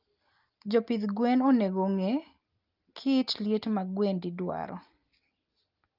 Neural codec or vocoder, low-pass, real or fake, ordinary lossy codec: none; 5.4 kHz; real; Opus, 32 kbps